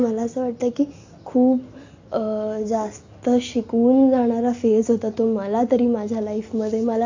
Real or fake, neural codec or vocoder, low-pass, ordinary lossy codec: real; none; 7.2 kHz; AAC, 48 kbps